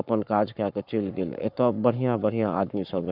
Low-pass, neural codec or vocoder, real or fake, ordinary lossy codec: 5.4 kHz; codec, 44.1 kHz, 7.8 kbps, Pupu-Codec; fake; none